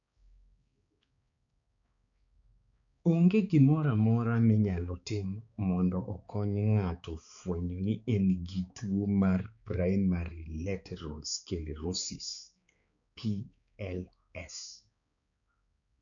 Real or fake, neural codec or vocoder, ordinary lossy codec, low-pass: fake; codec, 16 kHz, 4 kbps, X-Codec, HuBERT features, trained on balanced general audio; none; 7.2 kHz